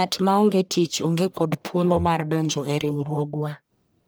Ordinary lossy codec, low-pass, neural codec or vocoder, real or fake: none; none; codec, 44.1 kHz, 1.7 kbps, Pupu-Codec; fake